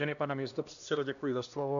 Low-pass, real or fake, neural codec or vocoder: 7.2 kHz; fake; codec, 16 kHz, 1 kbps, X-Codec, HuBERT features, trained on LibriSpeech